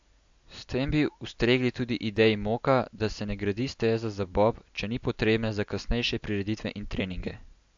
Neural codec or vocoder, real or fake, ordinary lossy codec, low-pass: none; real; AAC, 64 kbps; 7.2 kHz